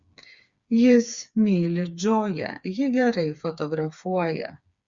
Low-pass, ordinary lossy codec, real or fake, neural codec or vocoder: 7.2 kHz; Opus, 64 kbps; fake; codec, 16 kHz, 4 kbps, FreqCodec, smaller model